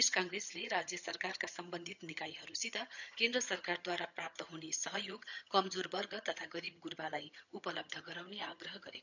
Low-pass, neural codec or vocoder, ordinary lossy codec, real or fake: 7.2 kHz; vocoder, 22.05 kHz, 80 mel bands, HiFi-GAN; none; fake